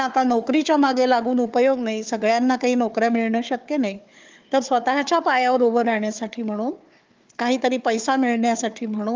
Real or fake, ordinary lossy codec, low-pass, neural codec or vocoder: fake; Opus, 24 kbps; 7.2 kHz; codec, 16 kHz, 4 kbps, FunCodec, trained on Chinese and English, 50 frames a second